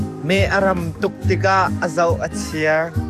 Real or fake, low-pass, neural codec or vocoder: fake; 14.4 kHz; codec, 44.1 kHz, 7.8 kbps, DAC